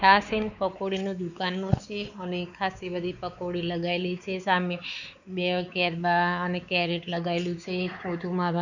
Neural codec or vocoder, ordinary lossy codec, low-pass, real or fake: codec, 16 kHz, 4 kbps, X-Codec, WavLM features, trained on Multilingual LibriSpeech; none; 7.2 kHz; fake